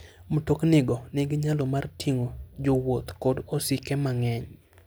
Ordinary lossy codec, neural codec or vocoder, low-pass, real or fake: none; none; none; real